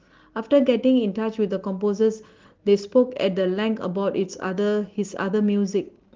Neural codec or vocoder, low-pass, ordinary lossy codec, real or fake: none; 7.2 kHz; Opus, 16 kbps; real